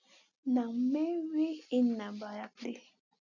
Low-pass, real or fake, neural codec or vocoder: 7.2 kHz; real; none